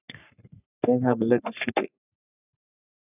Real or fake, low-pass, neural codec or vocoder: fake; 3.6 kHz; codec, 44.1 kHz, 3.4 kbps, Pupu-Codec